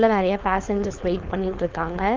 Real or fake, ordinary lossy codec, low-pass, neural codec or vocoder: fake; Opus, 24 kbps; 7.2 kHz; codec, 16 kHz, 4.8 kbps, FACodec